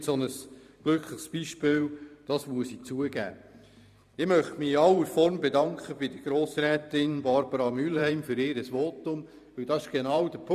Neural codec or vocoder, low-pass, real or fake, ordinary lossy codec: vocoder, 44.1 kHz, 128 mel bands every 512 samples, BigVGAN v2; 14.4 kHz; fake; none